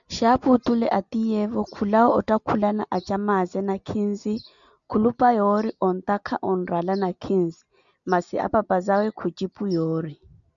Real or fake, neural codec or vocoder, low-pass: real; none; 7.2 kHz